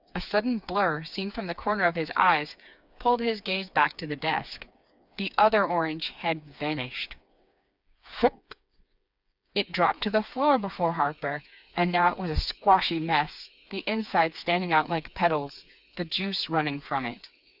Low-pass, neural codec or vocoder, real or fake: 5.4 kHz; codec, 16 kHz, 4 kbps, FreqCodec, smaller model; fake